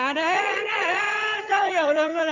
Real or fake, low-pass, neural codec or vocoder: fake; 7.2 kHz; vocoder, 22.05 kHz, 80 mel bands, HiFi-GAN